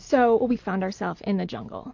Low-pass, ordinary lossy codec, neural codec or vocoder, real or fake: 7.2 kHz; AAC, 48 kbps; none; real